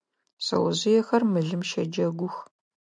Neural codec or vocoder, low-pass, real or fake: none; 9.9 kHz; real